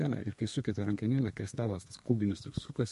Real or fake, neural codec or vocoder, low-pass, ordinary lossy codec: fake; codec, 32 kHz, 1.9 kbps, SNAC; 14.4 kHz; MP3, 48 kbps